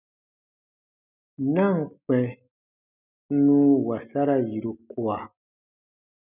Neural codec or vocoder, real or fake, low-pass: none; real; 3.6 kHz